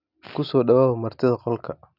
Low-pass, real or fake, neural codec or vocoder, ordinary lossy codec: 5.4 kHz; real; none; none